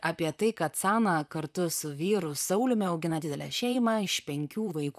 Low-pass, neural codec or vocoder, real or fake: 14.4 kHz; vocoder, 44.1 kHz, 128 mel bands, Pupu-Vocoder; fake